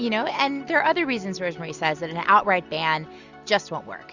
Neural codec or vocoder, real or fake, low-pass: none; real; 7.2 kHz